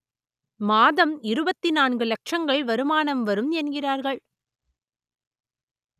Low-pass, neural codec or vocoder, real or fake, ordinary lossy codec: 14.4 kHz; none; real; none